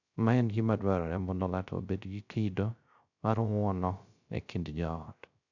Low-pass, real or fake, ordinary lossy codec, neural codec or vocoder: 7.2 kHz; fake; none; codec, 16 kHz, 0.3 kbps, FocalCodec